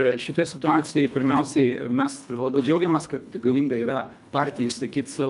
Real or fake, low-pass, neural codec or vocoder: fake; 10.8 kHz; codec, 24 kHz, 1.5 kbps, HILCodec